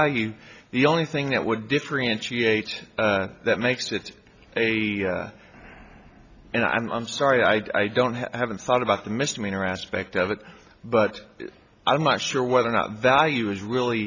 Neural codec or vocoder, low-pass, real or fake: none; 7.2 kHz; real